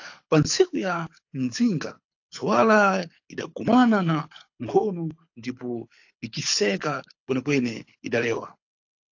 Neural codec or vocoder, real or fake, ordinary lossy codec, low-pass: codec, 24 kHz, 6 kbps, HILCodec; fake; AAC, 48 kbps; 7.2 kHz